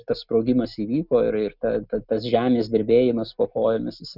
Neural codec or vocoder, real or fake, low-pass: none; real; 5.4 kHz